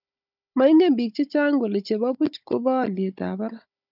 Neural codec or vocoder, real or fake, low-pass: codec, 16 kHz, 16 kbps, FunCodec, trained on Chinese and English, 50 frames a second; fake; 5.4 kHz